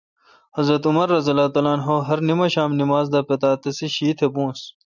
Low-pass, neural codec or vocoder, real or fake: 7.2 kHz; none; real